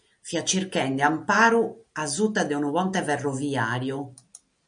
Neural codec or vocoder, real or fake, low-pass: none; real; 9.9 kHz